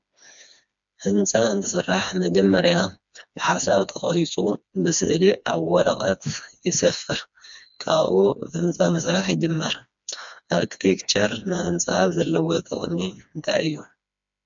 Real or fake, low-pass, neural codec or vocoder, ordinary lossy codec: fake; 7.2 kHz; codec, 16 kHz, 2 kbps, FreqCodec, smaller model; MP3, 64 kbps